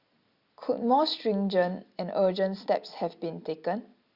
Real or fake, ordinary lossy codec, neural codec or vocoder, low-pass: real; Opus, 64 kbps; none; 5.4 kHz